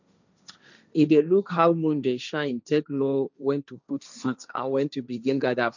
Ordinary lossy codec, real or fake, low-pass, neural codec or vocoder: none; fake; 7.2 kHz; codec, 16 kHz, 1.1 kbps, Voila-Tokenizer